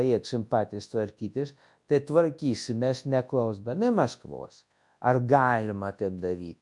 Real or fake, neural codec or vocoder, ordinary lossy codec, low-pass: fake; codec, 24 kHz, 0.9 kbps, WavTokenizer, large speech release; MP3, 64 kbps; 10.8 kHz